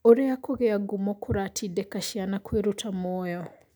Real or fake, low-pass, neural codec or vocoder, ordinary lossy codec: real; none; none; none